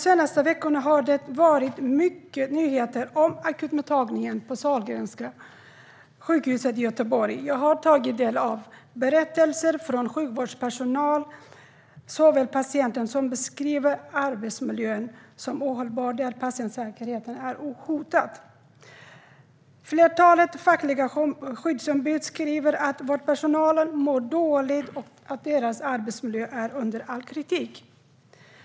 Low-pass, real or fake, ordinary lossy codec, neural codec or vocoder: none; real; none; none